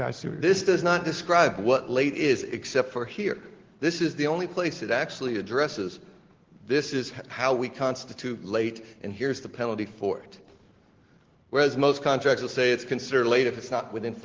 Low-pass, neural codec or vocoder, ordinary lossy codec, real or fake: 7.2 kHz; none; Opus, 16 kbps; real